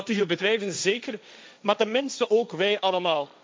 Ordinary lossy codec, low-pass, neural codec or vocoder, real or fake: none; none; codec, 16 kHz, 1.1 kbps, Voila-Tokenizer; fake